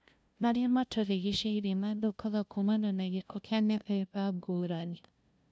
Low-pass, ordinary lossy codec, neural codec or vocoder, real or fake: none; none; codec, 16 kHz, 0.5 kbps, FunCodec, trained on LibriTTS, 25 frames a second; fake